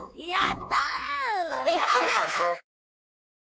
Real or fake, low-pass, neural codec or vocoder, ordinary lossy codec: fake; none; codec, 16 kHz, 2 kbps, X-Codec, WavLM features, trained on Multilingual LibriSpeech; none